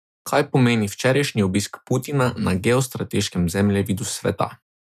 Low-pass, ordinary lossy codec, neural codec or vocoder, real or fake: 14.4 kHz; none; none; real